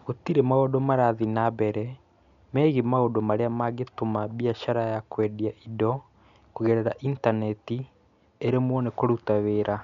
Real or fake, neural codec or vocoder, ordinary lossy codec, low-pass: real; none; none; 7.2 kHz